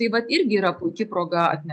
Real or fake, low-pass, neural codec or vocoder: real; 9.9 kHz; none